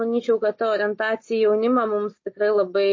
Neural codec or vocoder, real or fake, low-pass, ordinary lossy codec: none; real; 7.2 kHz; MP3, 32 kbps